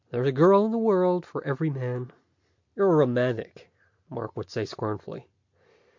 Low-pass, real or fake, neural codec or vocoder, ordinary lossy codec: 7.2 kHz; real; none; MP3, 48 kbps